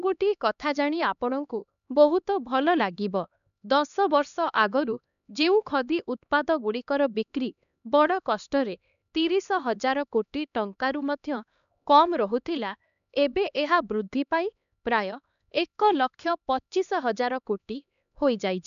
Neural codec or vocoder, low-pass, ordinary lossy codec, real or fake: codec, 16 kHz, 2 kbps, X-Codec, HuBERT features, trained on LibriSpeech; 7.2 kHz; none; fake